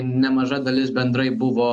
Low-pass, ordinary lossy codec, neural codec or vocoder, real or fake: 10.8 kHz; MP3, 64 kbps; none; real